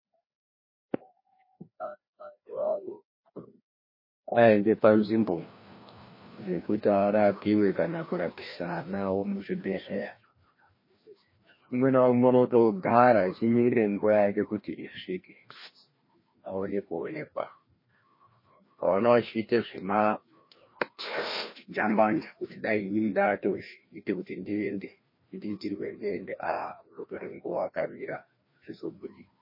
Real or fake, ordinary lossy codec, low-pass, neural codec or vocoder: fake; MP3, 24 kbps; 5.4 kHz; codec, 16 kHz, 1 kbps, FreqCodec, larger model